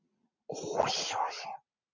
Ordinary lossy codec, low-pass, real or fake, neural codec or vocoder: MP3, 48 kbps; 7.2 kHz; real; none